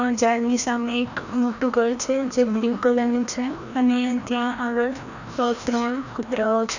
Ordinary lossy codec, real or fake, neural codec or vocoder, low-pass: none; fake; codec, 16 kHz, 1 kbps, FreqCodec, larger model; 7.2 kHz